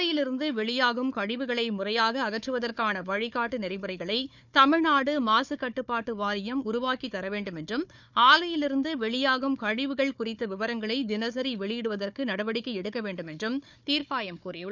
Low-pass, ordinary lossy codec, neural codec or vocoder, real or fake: 7.2 kHz; none; codec, 16 kHz, 4 kbps, FunCodec, trained on Chinese and English, 50 frames a second; fake